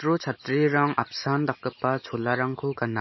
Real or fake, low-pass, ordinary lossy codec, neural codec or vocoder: real; 7.2 kHz; MP3, 24 kbps; none